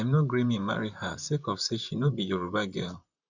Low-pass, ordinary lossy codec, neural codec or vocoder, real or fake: 7.2 kHz; none; vocoder, 44.1 kHz, 128 mel bands, Pupu-Vocoder; fake